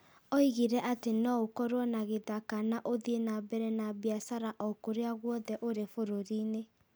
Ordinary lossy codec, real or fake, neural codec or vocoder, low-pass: none; real; none; none